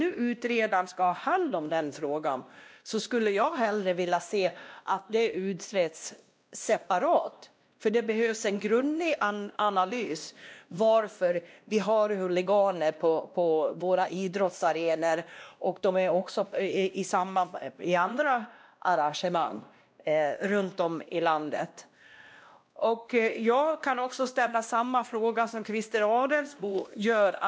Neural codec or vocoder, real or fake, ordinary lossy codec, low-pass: codec, 16 kHz, 1 kbps, X-Codec, WavLM features, trained on Multilingual LibriSpeech; fake; none; none